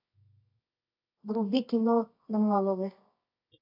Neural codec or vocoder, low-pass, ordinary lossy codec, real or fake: codec, 24 kHz, 0.9 kbps, WavTokenizer, medium music audio release; 5.4 kHz; AAC, 32 kbps; fake